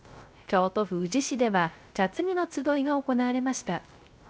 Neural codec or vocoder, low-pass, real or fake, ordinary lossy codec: codec, 16 kHz, 0.3 kbps, FocalCodec; none; fake; none